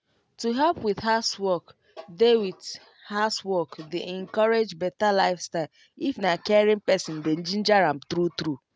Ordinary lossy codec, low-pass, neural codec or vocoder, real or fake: none; none; none; real